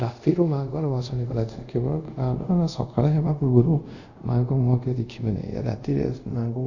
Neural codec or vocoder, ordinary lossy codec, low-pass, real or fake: codec, 24 kHz, 0.5 kbps, DualCodec; none; 7.2 kHz; fake